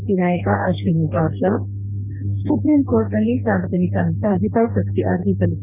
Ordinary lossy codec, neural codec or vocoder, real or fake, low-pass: none; codec, 44.1 kHz, 2.6 kbps, DAC; fake; 3.6 kHz